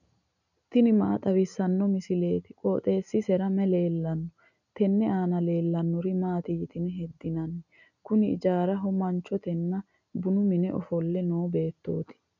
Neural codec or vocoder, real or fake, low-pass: none; real; 7.2 kHz